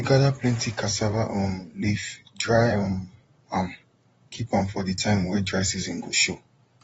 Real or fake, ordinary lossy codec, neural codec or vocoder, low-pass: fake; AAC, 24 kbps; vocoder, 44.1 kHz, 128 mel bands, Pupu-Vocoder; 19.8 kHz